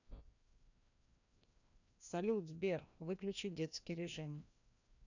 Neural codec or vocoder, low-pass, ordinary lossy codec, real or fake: codec, 16 kHz, 1 kbps, FreqCodec, larger model; 7.2 kHz; none; fake